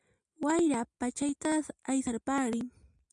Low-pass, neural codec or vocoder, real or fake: 10.8 kHz; none; real